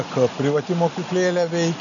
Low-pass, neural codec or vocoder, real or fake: 7.2 kHz; none; real